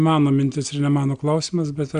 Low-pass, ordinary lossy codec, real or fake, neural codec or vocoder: 9.9 kHz; Opus, 64 kbps; real; none